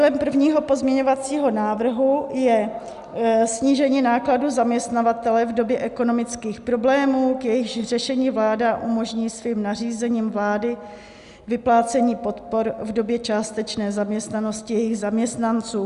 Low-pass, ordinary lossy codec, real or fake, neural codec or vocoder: 10.8 kHz; Opus, 64 kbps; real; none